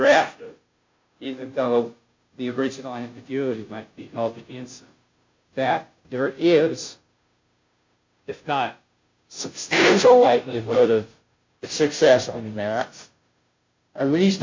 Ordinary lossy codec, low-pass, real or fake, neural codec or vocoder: MP3, 48 kbps; 7.2 kHz; fake; codec, 16 kHz, 0.5 kbps, FunCodec, trained on Chinese and English, 25 frames a second